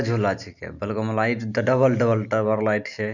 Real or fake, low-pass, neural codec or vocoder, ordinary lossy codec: real; 7.2 kHz; none; none